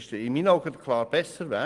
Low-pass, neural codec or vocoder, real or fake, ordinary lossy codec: 10.8 kHz; none; real; Opus, 24 kbps